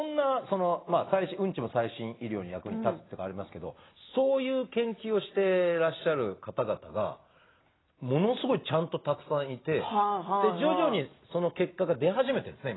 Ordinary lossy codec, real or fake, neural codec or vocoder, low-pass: AAC, 16 kbps; real; none; 7.2 kHz